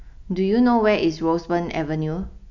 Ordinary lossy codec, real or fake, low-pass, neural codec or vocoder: none; real; 7.2 kHz; none